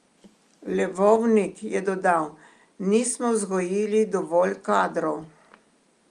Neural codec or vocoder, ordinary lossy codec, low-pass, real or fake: none; Opus, 32 kbps; 10.8 kHz; real